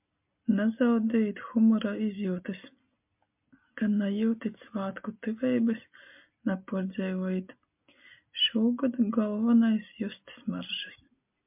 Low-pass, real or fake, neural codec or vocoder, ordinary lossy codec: 3.6 kHz; real; none; MP3, 32 kbps